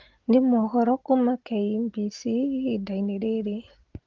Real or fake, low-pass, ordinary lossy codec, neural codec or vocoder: real; 7.2 kHz; Opus, 16 kbps; none